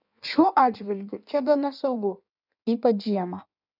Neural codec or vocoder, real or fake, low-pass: codec, 16 kHz in and 24 kHz out, 1.1 kbps, FireRedTTS-2 codec; fake; 5.4 kHz